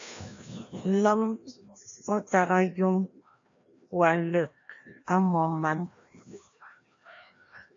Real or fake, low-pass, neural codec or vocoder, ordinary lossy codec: fake; 7.2 kHz; codec, 16 kHz, 1 kbps, FreqCodec, larger model; AAC, 48 kbps